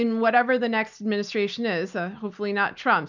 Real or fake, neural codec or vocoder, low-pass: real; none; 7.2 kHz